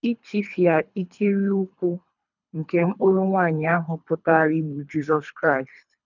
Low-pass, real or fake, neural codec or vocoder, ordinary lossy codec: 7.2 kHz; fake; codec, 24 kHz, 3 kbps, HILCodec; none